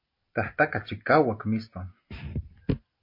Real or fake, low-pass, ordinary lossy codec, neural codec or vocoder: real; 5.4 kHz; MP3, 32 kbps; none